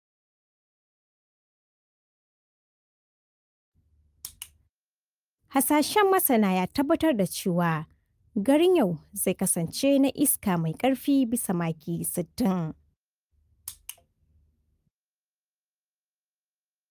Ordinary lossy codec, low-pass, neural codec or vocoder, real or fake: Opus, 32 kbps; 14.4 kHz; none; real